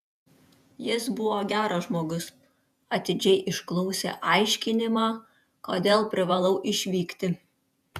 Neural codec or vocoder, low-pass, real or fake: vocoder, 48 kHz, 128 mel bands, Vocos; 14.4 kHz; fake